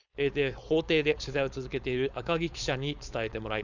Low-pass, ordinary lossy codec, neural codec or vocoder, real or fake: 7.2 kHz; none; codec, 16 kHz, 4.8 kbps, FACodec; fake